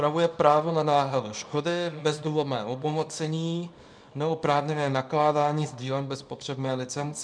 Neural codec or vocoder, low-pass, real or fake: codec, 24 kHz, 0.9 kbps, WavTokenizer, small release; 9.9 kHz; fake